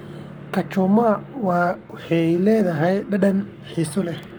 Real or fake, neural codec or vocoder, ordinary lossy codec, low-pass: fake; codec, 44.1 kHz, 7.8 kbps, Pupu-Codec; none; none